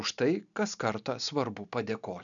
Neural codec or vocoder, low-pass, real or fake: none; 7.2 kHz; real